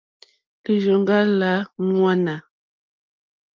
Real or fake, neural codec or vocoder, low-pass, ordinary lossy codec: real; none; 7.2 kHz; Opus, 24 kbps